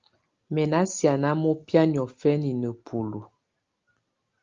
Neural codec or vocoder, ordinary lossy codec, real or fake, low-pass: none; Opus, 32 kbps; real; 7.2 kHz